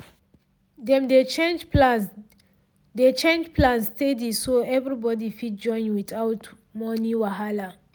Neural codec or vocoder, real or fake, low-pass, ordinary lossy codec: none; real; none; none